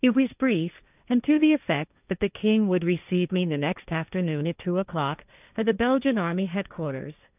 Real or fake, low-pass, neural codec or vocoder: fake; 3.6 kHz; codec, 16 kHz, 1.1 kbps, Voila-Tokenizer